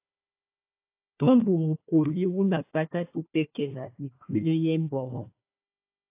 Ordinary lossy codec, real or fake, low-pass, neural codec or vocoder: AAC, 24 kbps; fake; 3.6 kHz; codec, 16 kHz, 1 kbps, FunCodec, trained on Chinese and English, 50 frames a second